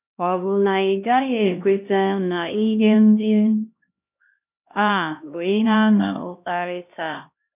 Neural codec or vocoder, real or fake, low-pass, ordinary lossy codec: codec, 16 kHz, 1 kbps, X-Codec, HuBERT features, trained on LibriSpeech; fake; 3.6 kHz; none